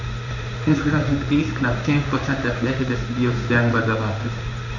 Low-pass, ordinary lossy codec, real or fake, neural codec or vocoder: 7.2 kHz; none; fake; codec, 16 kHz in and 24 kHz out, 1 kbps, XY-Tokenizer